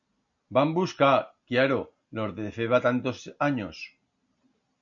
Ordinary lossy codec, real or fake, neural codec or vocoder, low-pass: MP3, 96 kbps; real; none; 7.2 kHz